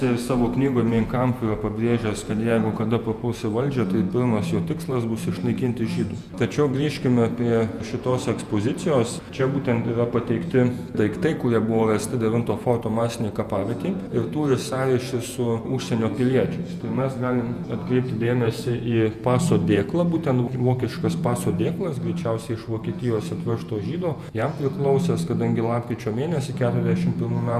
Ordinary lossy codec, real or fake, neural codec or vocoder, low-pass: Opus, 64 kbps; fake; vocoder, 48 kHz, 128 mel bands, Vocos; 14.4 kHz